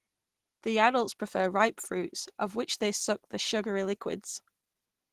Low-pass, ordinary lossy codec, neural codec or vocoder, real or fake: 14.4 kHz; Opus, 16 kbps; none; real